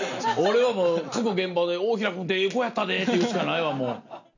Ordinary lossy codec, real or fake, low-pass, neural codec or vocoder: none; real; 7.2 kHz; none